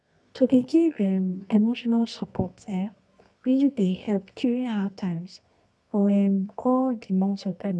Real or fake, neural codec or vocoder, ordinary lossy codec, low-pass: fake; codec, 24 kHz, 0.9 kbps, WavTokenizer, medium music audio release; none; none